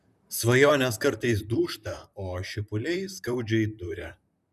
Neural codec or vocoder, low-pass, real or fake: vocoder, 44.1 kHz, 128 mel bands, Pupu-Vocoder; 14.4 kHz; fake